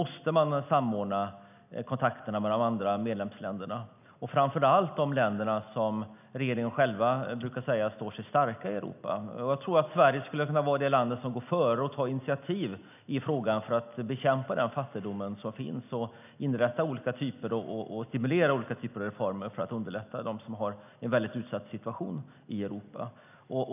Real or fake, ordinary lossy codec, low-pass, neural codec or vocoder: real; none; 3.6 kHz; none